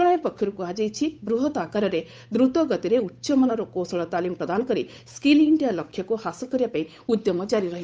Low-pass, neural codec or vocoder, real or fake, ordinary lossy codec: none; codec, 16 kHz, 8 kbps, FunCodec, trained on Chinese and English, 25 frames a second; fake; none